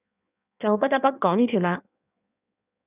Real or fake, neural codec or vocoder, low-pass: fake; codec, 16 kHz in and 24 kHz out, 1.1 kbps, FireRedTTS-2 codec; 3.6 kHz